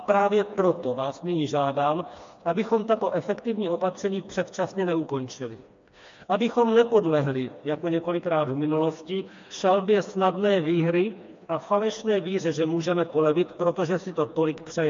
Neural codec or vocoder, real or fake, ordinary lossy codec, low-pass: codec, 16 kHz, 2 kbps, FreqCodec, smaller model; fake; MP3, 48 kbps; 7.2 kHz